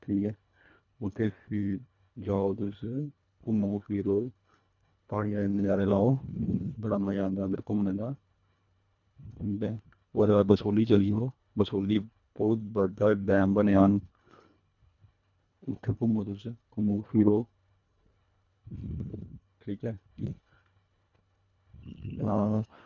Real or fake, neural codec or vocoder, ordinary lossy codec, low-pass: fake; codec, 24 kHz, 1.5 kbps, HILCodec; none; 7.2 kHz